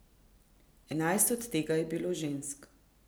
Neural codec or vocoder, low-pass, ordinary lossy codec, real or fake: none; none; none; real